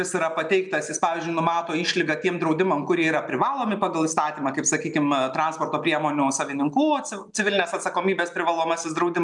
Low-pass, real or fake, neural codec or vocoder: 10.8 kHz; real; none